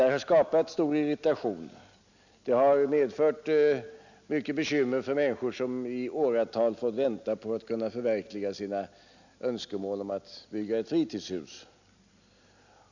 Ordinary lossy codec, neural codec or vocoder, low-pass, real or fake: none; none; 7.2 kHz; real